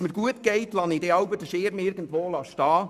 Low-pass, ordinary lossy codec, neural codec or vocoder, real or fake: 14.4 kHz; none; codec, 44.1 kHz, 7.8 kbps, Pupu-Codec; fake